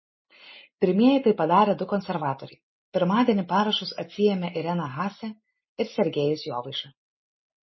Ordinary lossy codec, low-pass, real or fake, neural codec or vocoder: MP3, 24 kbps; 7.2 kHz; real; none